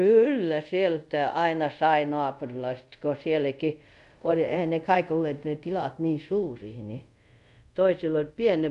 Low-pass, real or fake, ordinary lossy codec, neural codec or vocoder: 10.8 kHz; fake; none; codec, 24 kHz, 0.5 kbps, DualCodec